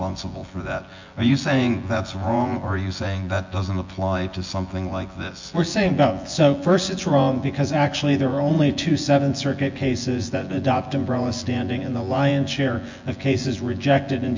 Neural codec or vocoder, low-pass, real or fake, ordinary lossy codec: vocoder, 24 kHz, 100 mel bands, Vocos; 7.2 kHz; fake; MP3, 48 kbps